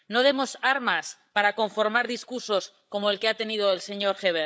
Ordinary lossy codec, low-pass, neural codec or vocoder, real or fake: none; none; codec, 16 kHz, 8 kbps, FreqCodec, larger model; fake